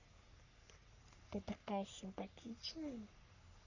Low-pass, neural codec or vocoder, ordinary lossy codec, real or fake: 7.2 kHz; codec, 44.1 kHz, 3.4 kbps, Pupu-Codec; none; fake